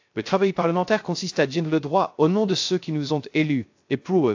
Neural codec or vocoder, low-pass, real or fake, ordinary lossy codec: codec, 16 kHz, 0.3 kbps, FocalCodec; 7.2 kHz; fake; AAC, 48 kbps